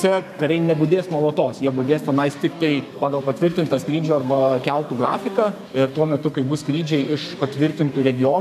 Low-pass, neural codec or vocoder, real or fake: 14.4 kHz; codec, 32 kHz, 1.9 kbps, SNAC; fake